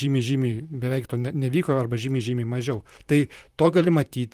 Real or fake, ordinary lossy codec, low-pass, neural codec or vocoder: fake; Opus, 32 kbps; 14.4 kHz; vocoder, 44.1 kHz, 128 mel bands, Pupu-Vocoder